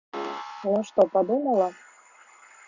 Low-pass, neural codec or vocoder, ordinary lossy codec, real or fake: 7.2 kHz; none; Opus, 64 kbps; real